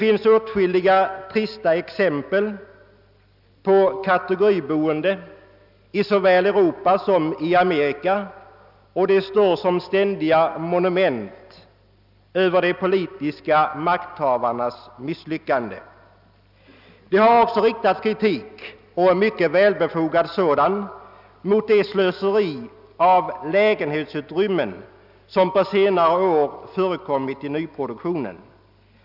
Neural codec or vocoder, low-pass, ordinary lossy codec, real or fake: none; 5.4 kHz; none; real